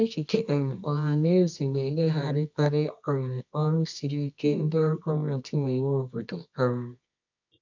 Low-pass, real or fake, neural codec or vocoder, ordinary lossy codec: 7.2 kHz; fake; codec, 24 kHz, 0.9 kbps, WavTokenizer, medium music audio release; MP3, 64 kbps